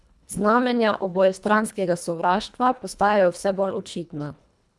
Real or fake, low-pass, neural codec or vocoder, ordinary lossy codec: fake; none; codec, 24 kHz, 1.5 kbps, HILCodec; none